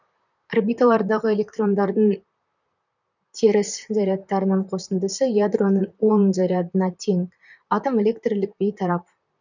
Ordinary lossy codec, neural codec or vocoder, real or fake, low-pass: none; vocoder, 44.1 kHz, 128 mel bands, Pupu-Vocoder; fake; 7.2 kHz